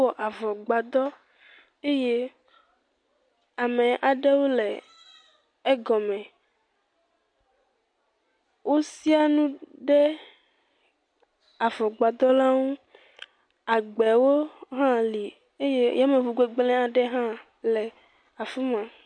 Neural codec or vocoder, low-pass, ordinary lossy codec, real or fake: none; 9.9 kHz; MP3, 64 kbps; real